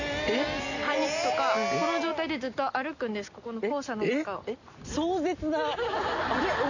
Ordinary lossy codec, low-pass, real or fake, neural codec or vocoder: none; 7.2 kHz; real; none